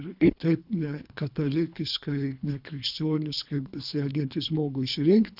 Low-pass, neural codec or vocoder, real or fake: 5.4 kHz; codec, 24 kHz, 3 kbps, HILCodec; fake